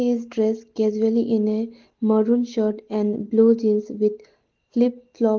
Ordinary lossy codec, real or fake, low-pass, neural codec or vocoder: Opus, 16 kbps; real; 7.2 kHz; none